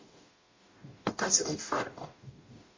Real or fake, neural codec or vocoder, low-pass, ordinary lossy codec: fake; codec, 44.1 kHz, 0.9 kbps, DAC; 7.2 kHz; MP3, 32 kbps